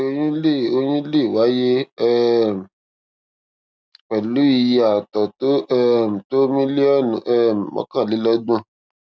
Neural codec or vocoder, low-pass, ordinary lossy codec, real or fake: none; none; none; real